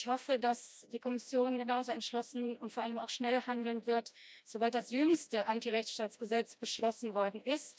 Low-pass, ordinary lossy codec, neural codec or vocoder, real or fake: none; none; codec, 16 kHz, 1 kbps, FreqCodec, smaller model; fake